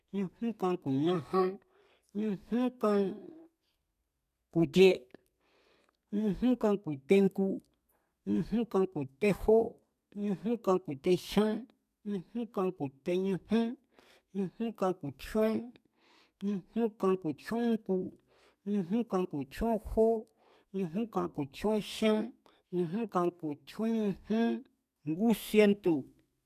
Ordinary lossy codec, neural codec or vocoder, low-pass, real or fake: none; codec, 32 kHz, 1.9 kbps, SNAC; 14.4 kHz; fake